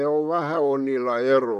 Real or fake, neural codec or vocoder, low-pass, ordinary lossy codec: fake; vocoder, 44.1 kHz, 128 mel bands, Pupu-Vocoder; 14.4 kHz; none